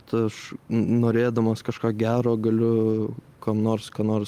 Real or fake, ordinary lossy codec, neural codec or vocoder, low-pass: real; Opus, 32 kbps; none; 14.4 kHz